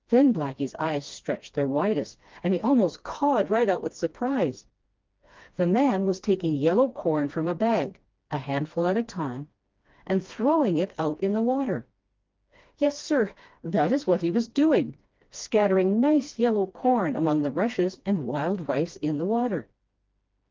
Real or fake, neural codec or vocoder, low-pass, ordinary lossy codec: fake; codec, 16 kHz, 2 kbps, FreqCodec, smaller model; 7.2 kHz; Opus, 32 kbps